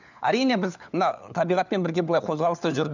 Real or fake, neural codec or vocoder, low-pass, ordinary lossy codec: fake; codec, 16 kHz, 4 kbps, FunCodec, trained on LibriTTS, 50 frames a second; 7.2 kHz; none